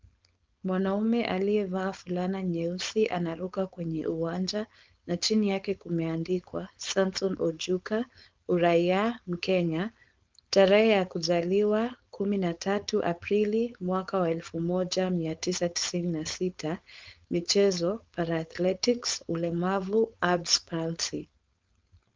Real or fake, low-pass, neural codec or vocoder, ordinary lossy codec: fake; 7.2 kHz; codec, 16 kHz, 4.8 kbps, FACodec; Opus, 32 kbps